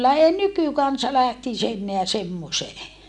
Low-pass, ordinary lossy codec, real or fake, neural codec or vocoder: 10.8 kHz; none; real; none